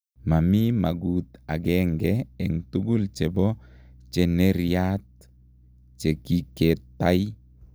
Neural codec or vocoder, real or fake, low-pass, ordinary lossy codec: none; real; none; none